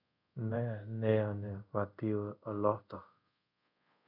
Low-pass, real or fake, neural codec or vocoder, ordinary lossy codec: 5.4 kHz; fake; codec, 24 kHz, 0.5 kbps, DualCodec; none